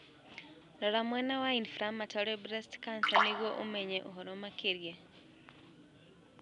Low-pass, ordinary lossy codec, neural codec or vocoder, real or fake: 10.8 kHz; none; none; real